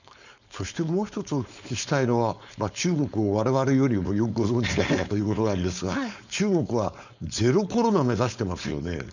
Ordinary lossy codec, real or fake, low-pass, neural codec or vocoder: none; fake; 7.2 kHz; codec, 16 kHz, 4.8 kbps, FACodec